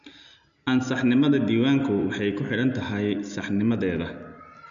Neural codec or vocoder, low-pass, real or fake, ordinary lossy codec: none; 7.2 kHz; real; none